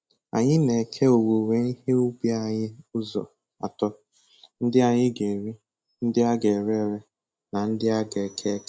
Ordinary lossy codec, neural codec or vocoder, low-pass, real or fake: none; none; none; real